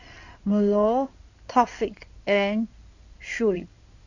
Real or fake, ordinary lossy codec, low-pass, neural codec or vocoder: fake; none; 7.2 kHz; codec, 16 kHz in and 24 kHz out, 2.2 kbps, FireRedTTS-2 codec